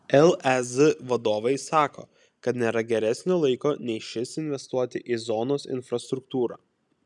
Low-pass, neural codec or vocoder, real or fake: 10.8 kHz; none; real